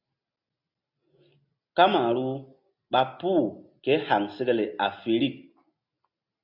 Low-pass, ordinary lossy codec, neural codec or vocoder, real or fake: 5.4 kHz; AAC, 48 kbps; none; real